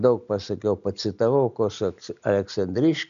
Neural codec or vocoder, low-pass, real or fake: none; 7.2 kHz; real